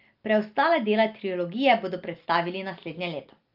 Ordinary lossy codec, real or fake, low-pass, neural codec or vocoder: Opus, 24 kbps; real; 5.4 kHz; none